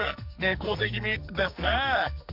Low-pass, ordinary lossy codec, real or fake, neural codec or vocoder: 5.4 kHz; none; fake; codec, 44.1 kHz, 2.6 kbps, SNAC